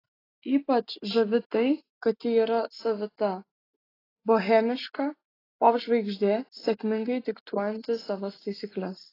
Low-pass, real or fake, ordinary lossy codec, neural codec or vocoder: 5.4 kHz; real; AAC, 24 kbps; none